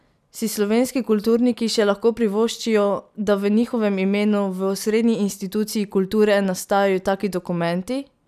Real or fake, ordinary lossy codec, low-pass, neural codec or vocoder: real; none; 14.4 kHz; none